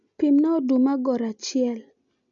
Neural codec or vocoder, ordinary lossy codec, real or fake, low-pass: none; none; real; 7.2 kHz